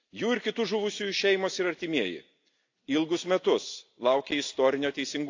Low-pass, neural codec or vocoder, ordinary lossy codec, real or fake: 7.2 kHz; none; AAC, 48 kbps; real